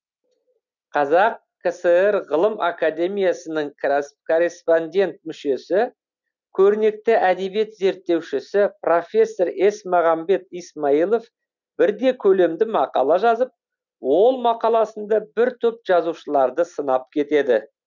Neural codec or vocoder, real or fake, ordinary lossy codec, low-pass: none; real; none; 7.2 kHz